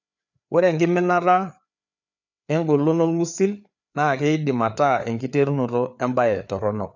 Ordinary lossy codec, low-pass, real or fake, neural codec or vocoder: none; 7.2 kHz; fake; codec, 16 kHz, 4 kbps, FreqCodec, larger model